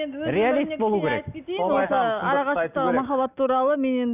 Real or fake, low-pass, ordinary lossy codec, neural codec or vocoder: real; 3.6 kHz; none; none